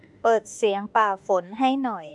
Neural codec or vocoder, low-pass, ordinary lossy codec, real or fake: autoencoder, 48 kHz, 32 numbers a frame, DAC-VAE, trained on Japanese speech; 10.8 kHz; none; fake